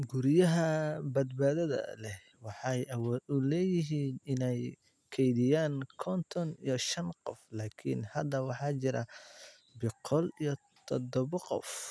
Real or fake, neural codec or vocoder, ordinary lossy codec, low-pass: real; none; none; none